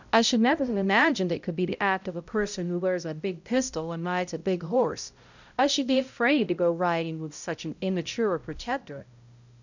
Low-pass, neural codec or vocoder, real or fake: 7.2 kHz; codec, 16 kHz, 0.5 kbps, X-Codec, HuBERT features, trained on balanced general audio; fake